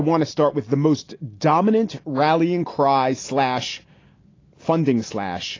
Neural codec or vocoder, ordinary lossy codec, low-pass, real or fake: none; AAC, 32 kbps; 7.2 kHz; real